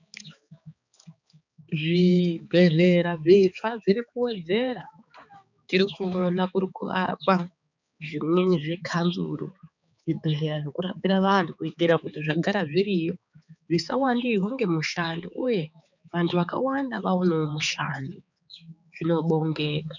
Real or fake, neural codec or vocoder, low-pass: fake; codec, 16 kHz, 4 kbps, X-Codec, HuBERT features, trained on balanced general audio; 7.2 kHz